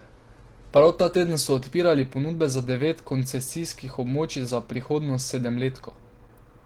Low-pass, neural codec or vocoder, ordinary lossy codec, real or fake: 19.8 kHz; none; Opus, 16 kbps; real